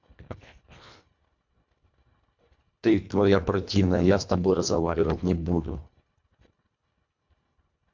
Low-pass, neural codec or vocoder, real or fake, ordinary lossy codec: 7.2 kHz; codec, 24 kHz, 1.5 kbps, HILCodec; fake; MP3, 64 kbps